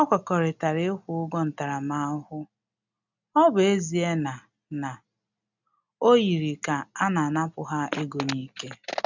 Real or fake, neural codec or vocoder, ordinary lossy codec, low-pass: real; none; none; 7.2 kHz